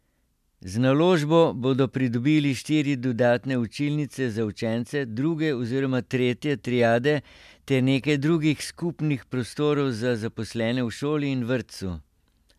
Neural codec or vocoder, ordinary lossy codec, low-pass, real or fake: none; MP3, 96 kbps; 14.4 kHz; real